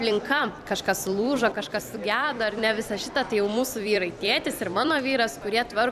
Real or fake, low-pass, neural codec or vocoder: fake; 14.4 kHz; vocoder, 44.1 kHz, 128 mel bands every 256 samples, BigVGAN v2